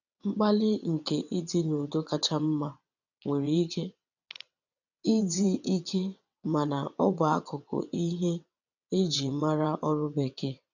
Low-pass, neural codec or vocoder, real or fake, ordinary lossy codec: 7.2 kHz; vocoder, 22.05 kHz, 80 mel bands, WaveNeXt; fake; none